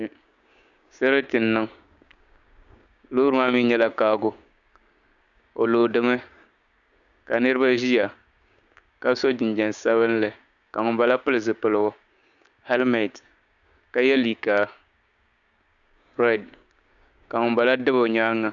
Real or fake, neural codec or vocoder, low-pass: fake; codec, 16 kHz, 6 kbps, DAC; 7.2 kHz